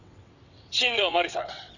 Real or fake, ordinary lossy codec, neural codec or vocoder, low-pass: fake; none; vocoder, 22.05 kHz, 80 mel bands, WaveNeXt; 7.2 kHz